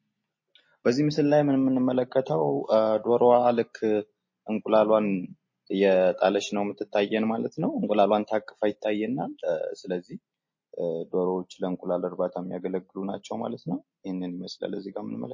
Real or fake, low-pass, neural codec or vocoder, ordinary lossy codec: real; 7.2 kHz; none; MP3, 32 kbps